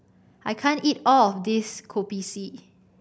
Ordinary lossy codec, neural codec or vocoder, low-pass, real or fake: none; none; none; real